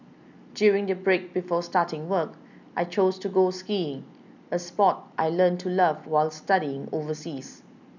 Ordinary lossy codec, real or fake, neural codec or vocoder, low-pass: none; real; none; 7.2 kHz